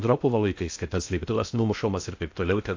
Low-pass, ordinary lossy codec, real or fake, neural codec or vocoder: 7.2 kHz; AAC, 48 kbps; fake; codec, 16 kHz in and 24 kHz out, 0.6 kbps, FocalCodec, streaming, 2048 codes